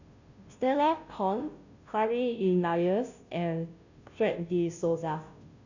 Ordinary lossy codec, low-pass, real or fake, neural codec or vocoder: none; 7.2 kHz; fake; codec, 16 kHz, 0.5 kbps, FunCodec, trained on Chinese and English, 25 frames a second